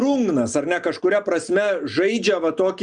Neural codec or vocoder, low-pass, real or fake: none; 9.9 kHz; real